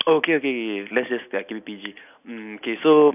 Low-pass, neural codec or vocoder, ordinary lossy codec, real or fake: 3.6 kHz; none; none; real